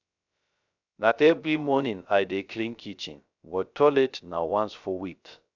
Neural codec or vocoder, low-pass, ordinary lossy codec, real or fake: codec, 16 kHz, 0.3 kbps, FocalCodec; 7.2 kHz; none; fake